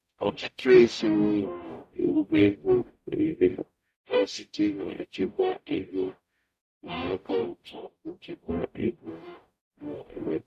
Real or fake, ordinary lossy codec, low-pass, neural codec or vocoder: fake; none; 14.4 kHz; codec, 44.1 kHz, 0.9 kbps, DAC